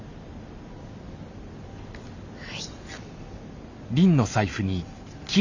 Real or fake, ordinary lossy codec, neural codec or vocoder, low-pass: real; none; none; 7.2 kHz